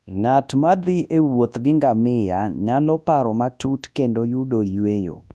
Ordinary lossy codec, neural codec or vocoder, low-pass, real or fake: none; codec, 24 kHz, 0.9 kbps, WavTokenizer, large speech release; none; fake